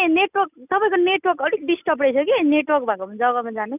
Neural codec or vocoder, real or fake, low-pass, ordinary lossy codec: none; real; 3.6 kHz; none